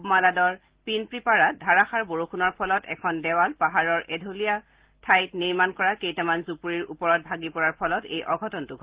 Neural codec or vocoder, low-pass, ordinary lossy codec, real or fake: none; 3.6 kHz; Opus, 16 kbps; real